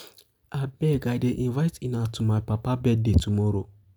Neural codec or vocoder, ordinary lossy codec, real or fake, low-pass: none; none; real; none